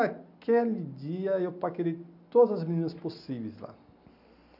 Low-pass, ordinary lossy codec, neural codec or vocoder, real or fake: 5.4 kHz; none; none; real